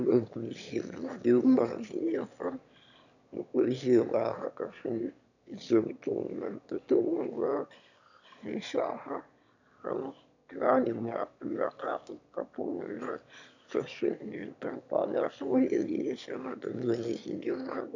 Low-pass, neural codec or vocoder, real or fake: 7.2 kHz; autoencoder, 22.05 kHz, a latent of 192 numbers a frame, VITS, trained on one speaker; fake